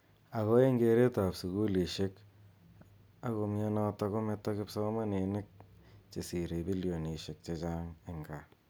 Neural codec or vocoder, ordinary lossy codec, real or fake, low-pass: none; none; real; none